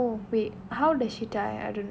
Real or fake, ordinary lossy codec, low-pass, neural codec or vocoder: real; none; none; none